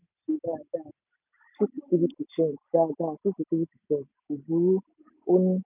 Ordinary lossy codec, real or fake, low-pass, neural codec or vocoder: none; real; 3.6 kHz; none